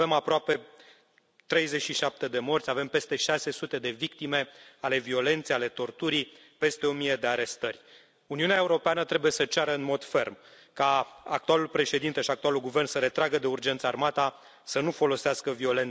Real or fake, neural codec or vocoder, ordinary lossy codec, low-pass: real; none; none; none